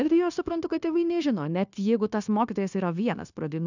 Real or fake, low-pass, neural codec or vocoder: fake; 7.2 kHz; codec, 16 kHz, 0.9 kbps, LongCat-Audio-Codec